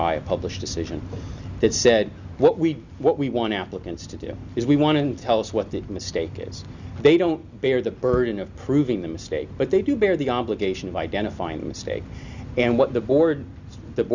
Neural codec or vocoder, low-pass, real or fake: none; 7.2 kHz; real